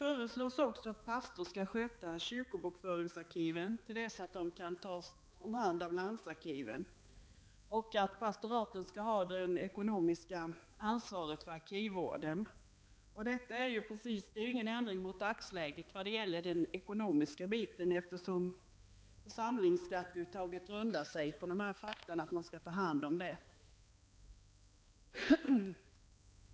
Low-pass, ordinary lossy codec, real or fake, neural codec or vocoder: none; none; fake; codec, 16 kHz, 2 kbps, X-Codec, HuBERT features, trained on balanced general audio